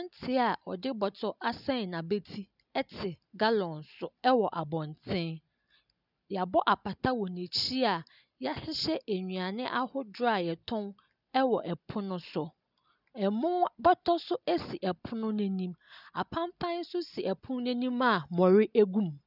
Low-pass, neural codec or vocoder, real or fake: 5.4 kHz; none; real